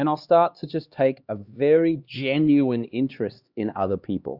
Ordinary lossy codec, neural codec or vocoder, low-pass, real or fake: Opus, 64 kbps; codec, 16 kHz, 2 kbps, X-Codec, HuBERT features, trained on LibriSpeech; 5.4 kHz; fake